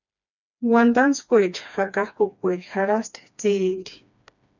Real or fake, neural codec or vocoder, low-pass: fake; codec, 16 kHz, 2 kbps, FreqCodec, smaller model; 7.2 kHz